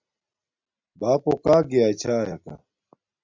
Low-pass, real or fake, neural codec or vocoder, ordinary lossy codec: 7.2 kHz; real; none; MP3, 64 kbps